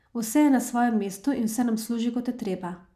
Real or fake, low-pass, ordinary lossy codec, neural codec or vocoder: real; 14.4 kHz; none; none